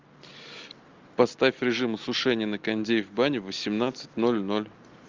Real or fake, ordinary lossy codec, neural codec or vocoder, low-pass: real; Opus, 24 kbps; none; 7.2 kHz